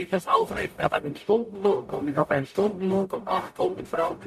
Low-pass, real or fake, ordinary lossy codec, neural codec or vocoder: 14.4 kHz; fake; MP3, 64 kbps; codec, 44.1 kHz, 0.9 kbps, DAC